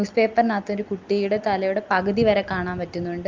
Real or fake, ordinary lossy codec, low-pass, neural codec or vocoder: real; Opus, 24 kbps; 7.2 kHz; none